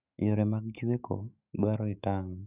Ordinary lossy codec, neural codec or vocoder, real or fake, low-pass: none; autoencoder, 48 kHz, 128 numbers a frame, DAC-VAE, trained on Japanese speech; fake; 3.6 kHz